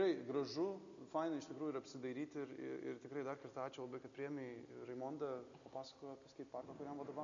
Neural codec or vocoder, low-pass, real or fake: none; 7.2 kHz; real